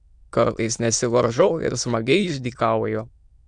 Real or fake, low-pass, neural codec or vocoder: fake; 9.9 kHz; autoencoder, 22.05 kHz, a latent of 192 numbers a frame, VITS, trained on many speakers